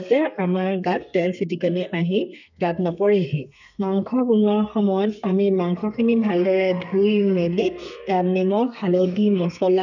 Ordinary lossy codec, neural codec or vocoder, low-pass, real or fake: none; codec, 32 kHz, 1.9 kbps, SNAC; 7.2 kHz; fake